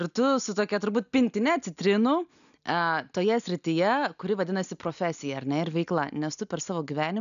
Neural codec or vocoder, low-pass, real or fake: none; 7.2 kHz; real